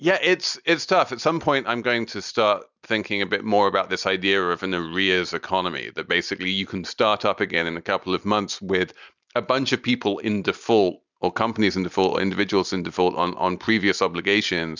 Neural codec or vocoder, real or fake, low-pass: none; real; 7.2 kHz